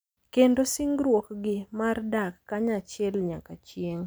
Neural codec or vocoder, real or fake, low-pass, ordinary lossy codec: none; real; none; none